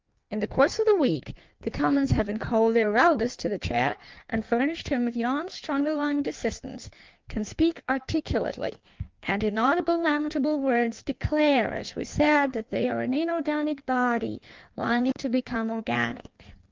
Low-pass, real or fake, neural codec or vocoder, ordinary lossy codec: 7.2 kHz; fake; codec, 16 kHz in and 24 kHz out, 1.1 kbps, FireRedTTS-2 codec; Opus, 32 kbps